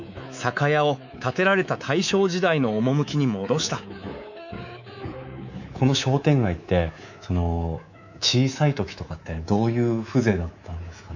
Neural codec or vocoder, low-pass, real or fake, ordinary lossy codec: codec, 24 kHz, 3.1 kbps, DualCodec; 7.2 kHz; fake; none